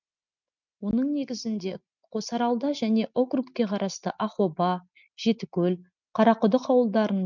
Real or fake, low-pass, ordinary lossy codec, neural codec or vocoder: real; 7.2 kHz; none; none